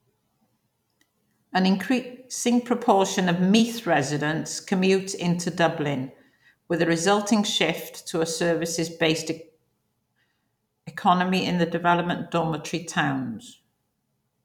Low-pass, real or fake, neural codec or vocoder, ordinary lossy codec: 19.8 kHz; fake; vocoder, 44.1 kHz, 128 mel bands every 256 samples, BigVGAN v2; none